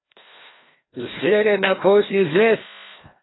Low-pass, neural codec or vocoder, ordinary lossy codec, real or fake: 7.2 kHz; codec, 16 kHz, 1 kbps, FreqCodec, larger model; AAC, 16 kbps; fake